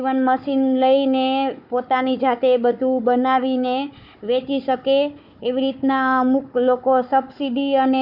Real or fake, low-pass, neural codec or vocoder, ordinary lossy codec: fake; 5.4 kHz; codec, 16 kHz, 4 kbps, FunCodec, trained on Chinese and English, 50 frames a second; none